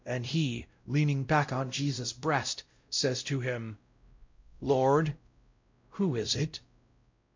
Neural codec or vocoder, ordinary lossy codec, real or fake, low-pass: codec, 16 kHz, 0.5 kbps, X-Codec, WavLM features, trained on Multilingual LibriSpeech; MP3, 64 kbps; fake; 7.2 kHz